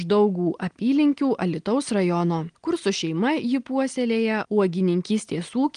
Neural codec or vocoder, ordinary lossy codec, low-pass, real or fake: none; Opus, 24 kbps; 9.9 kHz; real